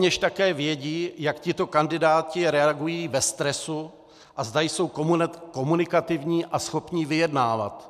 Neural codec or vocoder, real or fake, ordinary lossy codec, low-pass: none; real; MP3, 96 kbps; 14.4 kHz